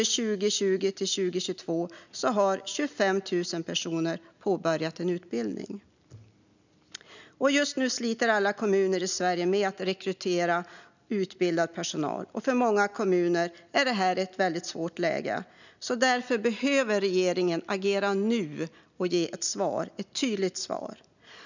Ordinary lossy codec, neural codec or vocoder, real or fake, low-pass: none; none; real; 7.2 kHz